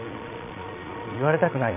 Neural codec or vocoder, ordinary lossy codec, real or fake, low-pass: vocoder, 22.05 kHz, 80 mel bands, Vocos; none; fake; 3.6 kHz